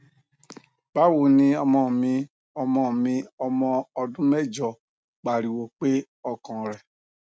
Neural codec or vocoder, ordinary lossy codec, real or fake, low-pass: none; none; real; none